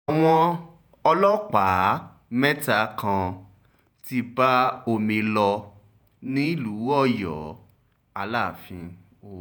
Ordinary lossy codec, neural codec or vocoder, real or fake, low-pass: none; vocoder, 48 kHz, 128 mel bands, Vocos; fake; none